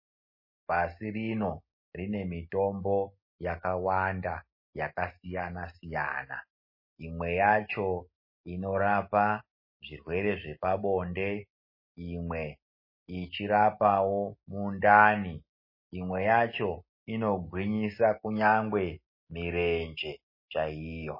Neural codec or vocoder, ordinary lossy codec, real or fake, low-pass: none; MP3, 24 kbps; real; 5.4 kHz